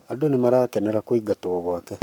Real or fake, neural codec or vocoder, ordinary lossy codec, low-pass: fake; codec, 44.1 kHz, 7.8 kbps, Pupu-Codec; none; 19.8 kHz